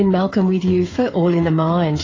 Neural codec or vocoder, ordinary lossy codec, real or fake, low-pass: codec, 16 kHz, 8 kbps, FreqCodec, smaller model; AAC, 32 kbps; fake; 7.2 kHz